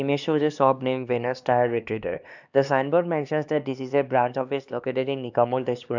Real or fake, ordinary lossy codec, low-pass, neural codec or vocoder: fake; none; 7.2 kHz; codec, 16 kHz, 2 kbps, X-Codec, HuBERT features, trained on LibriSpeech